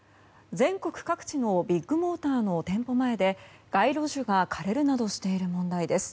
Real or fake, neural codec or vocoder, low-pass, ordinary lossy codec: real; none; none; none